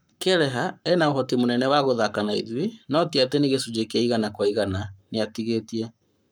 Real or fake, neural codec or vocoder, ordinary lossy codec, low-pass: fake; codec, 44.1 kHz, 7.8 kbps, Pupu-Codec; none; none